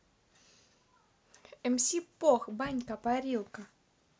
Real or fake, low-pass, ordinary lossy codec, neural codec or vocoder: real; none; none; none